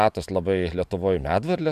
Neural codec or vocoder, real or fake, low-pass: vocoder, 44.1 kHz, 128 mel bands every 512 samples, BigVGAN v2; fake; 14.4 kHz